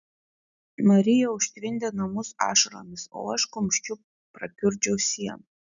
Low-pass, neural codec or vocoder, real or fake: 7.2 kHz; none; real